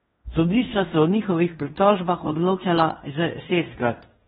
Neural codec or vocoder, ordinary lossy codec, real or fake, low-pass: codec, 16 kHz in and 24 kHz out, 0.9 kbps, LongCat-Audio-Codec, fine tuned four codebook decoder; AAC, 16 kbps; fake; 10.8 kHz